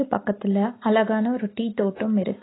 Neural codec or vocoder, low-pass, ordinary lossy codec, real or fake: none; 7.2 kHz; AAC, 16 kbps; real